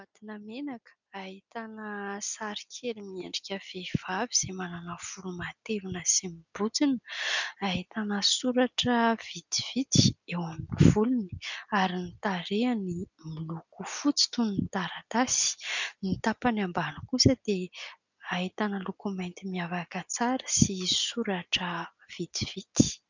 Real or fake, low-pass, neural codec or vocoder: real; 7.2 kHz; none